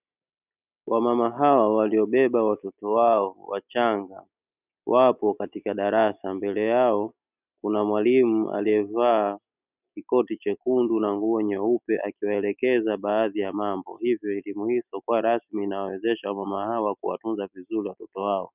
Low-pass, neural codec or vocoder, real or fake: 3.6 kHz; none; real